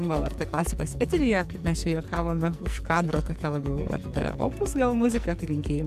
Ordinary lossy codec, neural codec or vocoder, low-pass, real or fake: MP3, 96 kbps; codec, 44.1 kHz, 2.6 kbps, SNAC; 14.4 kHz; fake